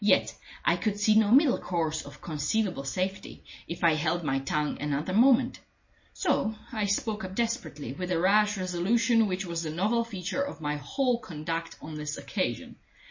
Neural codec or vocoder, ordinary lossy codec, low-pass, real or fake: none; MP3, 32 kbps; 7.2 kHz; real